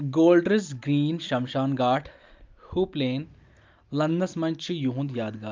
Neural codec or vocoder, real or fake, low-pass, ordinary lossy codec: none; real; 7.2 kHz; Opus, 24 kbps